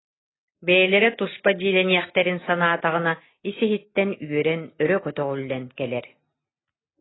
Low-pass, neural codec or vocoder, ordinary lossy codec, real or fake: 7.2 kHz; none; AAC, 16 kbps; real